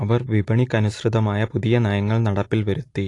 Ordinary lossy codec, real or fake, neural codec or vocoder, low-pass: AAC, 48 kbps; real; none; 10.8 kHz